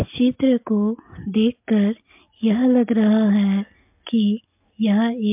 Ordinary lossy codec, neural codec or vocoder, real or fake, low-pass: MP3, 32 kbps; none; real; 3.6 kHz